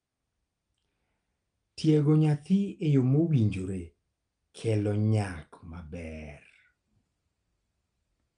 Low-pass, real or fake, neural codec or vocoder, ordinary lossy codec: 9.9 kHz; real; none; Opus, 32 kbps